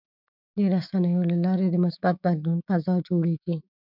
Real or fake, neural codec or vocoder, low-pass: fake; autoencoder, 48 kHz, 128 numbers a frame, DAC-VAE, trained on Japanese speech; 5.4 kHz